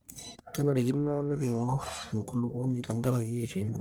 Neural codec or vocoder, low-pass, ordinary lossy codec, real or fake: codec, 44.1 kHz, 1.7 kbps, Pupu-Codec; none; none; fake